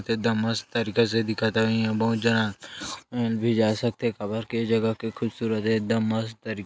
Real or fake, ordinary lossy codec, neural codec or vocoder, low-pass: real; none; none; none